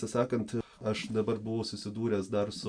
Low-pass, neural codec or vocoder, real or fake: 9.9 kHz; none; real